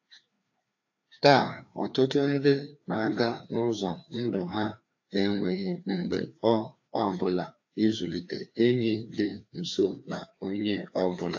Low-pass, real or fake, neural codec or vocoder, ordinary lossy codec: 7.2 kHz; fake; codec, 16 kHz, 2 kbps, FreqCodec, larger model; AAC, 48 kbps